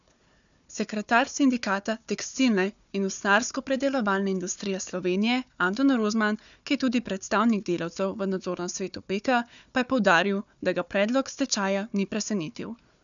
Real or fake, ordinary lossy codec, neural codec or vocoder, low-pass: fake; none; codec, 16 kHz, 4 kbps, FunCodec, trained on Chinese and English, 50 frames a second; 7.2 kHz